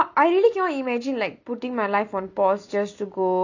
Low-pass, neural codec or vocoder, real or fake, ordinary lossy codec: 7.2 kHz; none; real; none